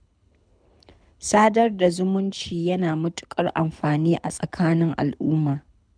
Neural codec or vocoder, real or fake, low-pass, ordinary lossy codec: codec, 24 kHz, 6 kbps, HILCodec; fake; 9.9 kHz; none